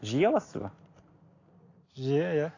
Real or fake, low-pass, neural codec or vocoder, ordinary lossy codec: real; 7.2 kHz; none; AAC, 32 kbps